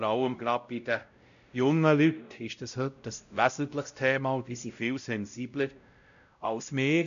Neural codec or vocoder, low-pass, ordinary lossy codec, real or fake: codec, 16 kHz, 0.5 kbps, X-Codec, WavLM features, trained on Multilingual LibriSpeech; 7.2 kHz; none; fake